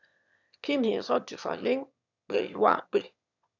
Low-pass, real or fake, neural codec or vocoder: 7.2 kHz; fake; autoencoder, 22.05 kHz, a latent of 192 numbers a frame, VITS, trained on one speaker